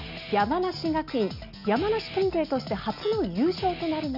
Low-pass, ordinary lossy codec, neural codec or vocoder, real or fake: 5.4 kHz; none; none; real